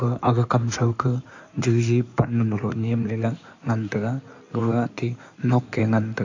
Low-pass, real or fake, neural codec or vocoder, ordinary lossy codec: 7.2 kHz; fake; codec, 16 kHz in and 24 kHz out, 2.2 kbps, FireRedTTS-2 codec; AAC, 48 kbps